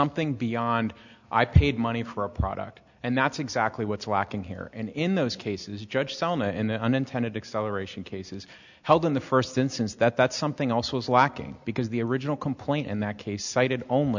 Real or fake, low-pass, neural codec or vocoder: real; 7.2 kHz; none